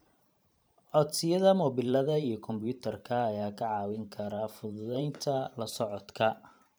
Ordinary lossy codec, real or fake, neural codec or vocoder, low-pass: none; fake; vocoder, 44.1 kHz, 128 mel bands every 512 samples, BigVGAN v2; none